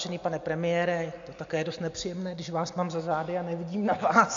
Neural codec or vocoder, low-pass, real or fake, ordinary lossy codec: none; 7.2 kHz; real; AAC, 64 kbps